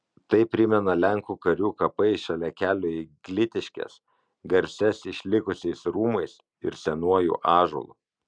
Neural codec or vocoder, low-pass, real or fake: none; 9.9 kHz; real